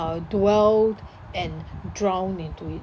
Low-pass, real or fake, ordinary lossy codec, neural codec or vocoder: none; real; none; none